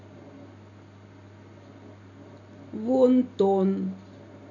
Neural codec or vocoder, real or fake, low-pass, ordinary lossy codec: none; real; 7.2 kHz; none